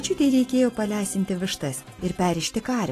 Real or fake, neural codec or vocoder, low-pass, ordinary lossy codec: real; none; 14.4 kHz; AAC, 48 kbps